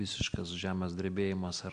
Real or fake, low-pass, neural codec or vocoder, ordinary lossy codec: real; 9.9 kHz; none; MP3, 64 kbps